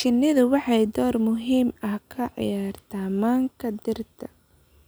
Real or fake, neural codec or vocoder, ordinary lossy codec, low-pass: real; none; none; none